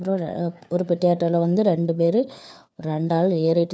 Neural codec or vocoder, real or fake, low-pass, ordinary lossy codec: codec, 16 kHz, 4 kbps, FunCodec, trained on LibriTTS, 50 frames a second; fake; none; none